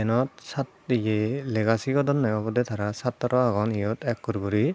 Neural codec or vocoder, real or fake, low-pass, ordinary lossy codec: none; real; none; none